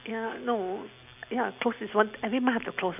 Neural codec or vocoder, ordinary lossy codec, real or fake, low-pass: none; none; real; 3.6 kHz